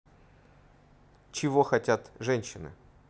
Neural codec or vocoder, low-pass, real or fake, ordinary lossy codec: none; none; real; none